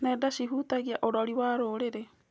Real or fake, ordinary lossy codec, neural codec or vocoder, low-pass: real; none; none; none